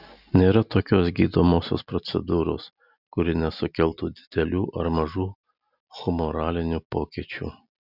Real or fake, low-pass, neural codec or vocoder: real; 5.4 kHz; none